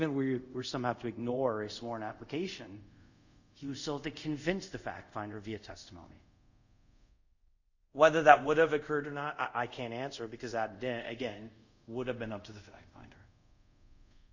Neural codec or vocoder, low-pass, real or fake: codec, 24 kHz, 0.5 kbps, DualCodec; 7.2 kHz; fake